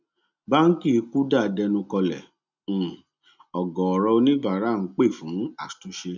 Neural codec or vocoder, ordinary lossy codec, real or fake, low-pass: none; none; real; 7.2 kHz